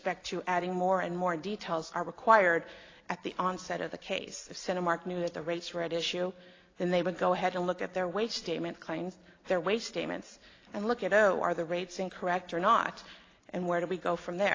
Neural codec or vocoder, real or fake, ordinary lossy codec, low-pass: none; real; AAC, 32 kbps; 7.2 kHz